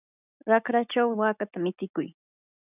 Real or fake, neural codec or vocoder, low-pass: real; none; 3.6 kHz